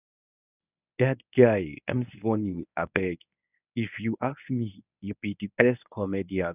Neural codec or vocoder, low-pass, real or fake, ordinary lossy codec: codec, 24 kHz, 0.9 kbps, WavTokenizer, medium speech release version 1; 3.6 kHz; fake; none